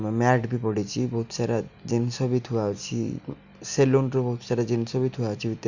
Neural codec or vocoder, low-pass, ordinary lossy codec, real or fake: none; 7.2 kHz; none; real